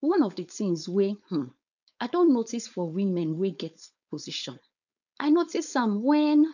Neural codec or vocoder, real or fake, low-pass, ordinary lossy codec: codec, 16 kHz, 4.8 kbps, FACodec; fake; 7.2 kHz; none